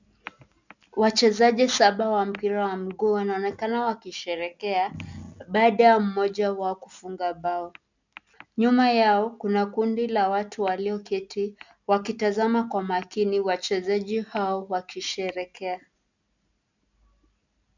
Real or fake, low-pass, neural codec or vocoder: real; 7.2 kHz; none